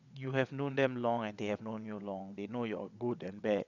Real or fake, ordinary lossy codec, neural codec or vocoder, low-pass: fake; none; vocoder, 22.05 kHz, 80 mel bands, WaveNeXt; 7.2 kHz